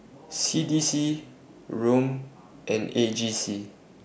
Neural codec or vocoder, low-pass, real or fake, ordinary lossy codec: none; none; real; none